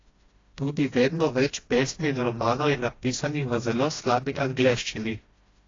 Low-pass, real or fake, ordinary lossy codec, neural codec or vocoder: 7.2 kHz; fake; AAC, 32 kbps; codec, 16 kHz, 1 kbps, FreqCodec, smaller model